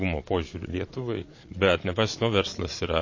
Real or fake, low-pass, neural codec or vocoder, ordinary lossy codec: fake; 7.2 kHz; vocoder, 22.05 kHz, 80 mel bands, Vocos; MP3, 32 kbps